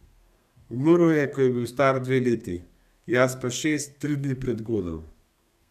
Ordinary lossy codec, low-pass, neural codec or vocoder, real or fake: none; 14.4 kHz; codec, 32 kHz, 1.9 kbps, SNAC; fake